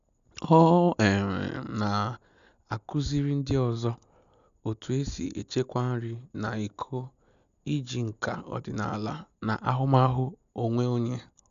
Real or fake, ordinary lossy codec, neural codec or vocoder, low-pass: real; none; none; 7.2 kHz